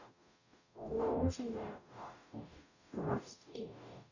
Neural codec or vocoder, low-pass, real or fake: codec, 44.1 kHz, 0.9 kbps, DAC; 7.2 kHz; fake